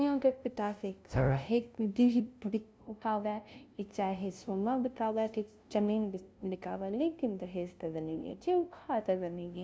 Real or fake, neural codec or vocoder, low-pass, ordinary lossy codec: fake; codec, 16 kHz, 0.5 kbps, FunCodec, trained on LibriTTS, 25 frames a second; none; none